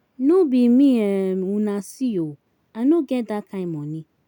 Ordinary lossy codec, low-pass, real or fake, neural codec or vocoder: none; 19.8 kHz; real; none